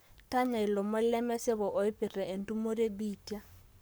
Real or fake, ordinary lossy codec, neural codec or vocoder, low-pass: fake; none; codec, 44.1 kHz, 7.8 kbps, Pupu-Codec; none